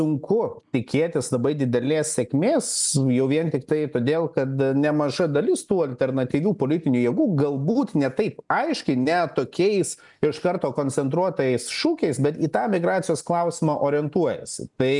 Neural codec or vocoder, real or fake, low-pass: none; real; 10.8 kHz